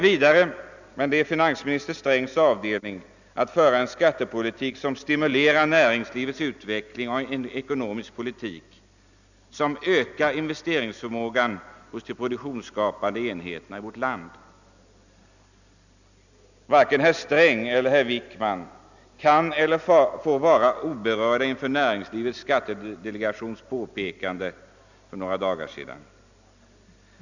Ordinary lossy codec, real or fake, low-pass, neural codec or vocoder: none; real; 7.2 kHz; none